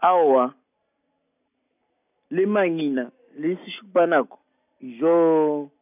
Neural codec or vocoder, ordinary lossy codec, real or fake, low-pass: none; none; real; 3.6 kHz